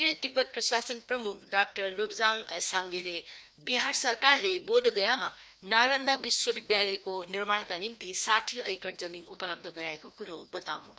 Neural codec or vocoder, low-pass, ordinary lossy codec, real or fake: codec, 16 kHz, 1 kbps, FreqCodec, larger model; none; none; fake